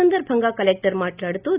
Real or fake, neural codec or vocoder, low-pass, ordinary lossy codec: real; none; 3.6 kHz; Opus, 64 kbps